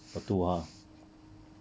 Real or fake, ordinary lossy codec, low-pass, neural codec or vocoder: real; none; none; none